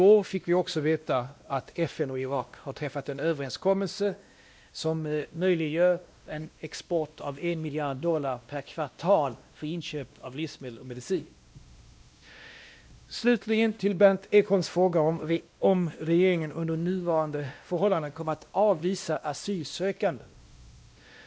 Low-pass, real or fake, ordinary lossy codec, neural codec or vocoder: none; fake; none; codec, 16 kHz, 1 kbps, X-Codec, WavLM features, trained on Multilingual LibriSpeech